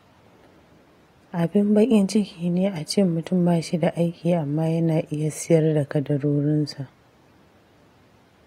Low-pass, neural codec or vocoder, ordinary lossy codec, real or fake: 19.8 kHz; none; AAC, 48 kbps; real